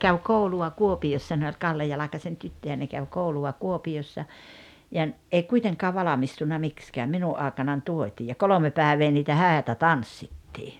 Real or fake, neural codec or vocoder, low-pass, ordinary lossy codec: real; none; 19.8 kHz; none